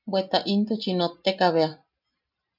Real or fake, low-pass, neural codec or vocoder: real; 5.4 kHz; none